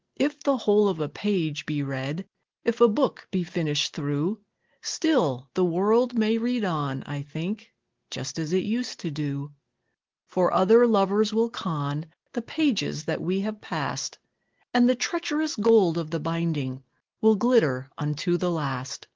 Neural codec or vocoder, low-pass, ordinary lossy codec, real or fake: none; 7.2 kHz; Opus, 16 kbps; real